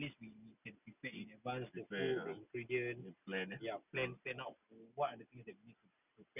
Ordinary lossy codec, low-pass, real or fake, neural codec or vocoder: none; 3.6 kHz; real; none